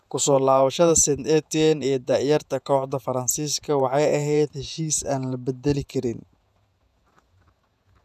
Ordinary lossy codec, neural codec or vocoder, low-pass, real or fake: none; vocoder, 48 kHz, 128 mel bands, Vocos; 14.4 kHz; fake